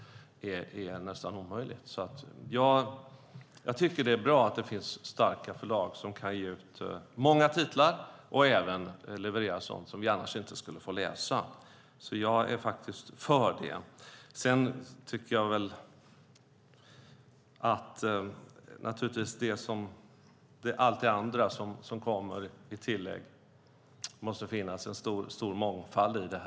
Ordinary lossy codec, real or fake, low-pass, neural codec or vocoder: none; real; none; none